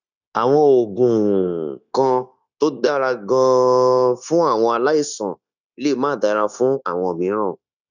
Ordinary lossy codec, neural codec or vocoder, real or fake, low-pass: none; codec, 16 kHz, 0.9 kbps, LongCat-Audio-Codec; fake; 7.2 kHz